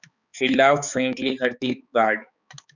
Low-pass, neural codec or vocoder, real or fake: 7.2 kHz; codec, 16 kHz, 4 kbps, X-Codec, HuBERT features, trained on balanced general audio; fake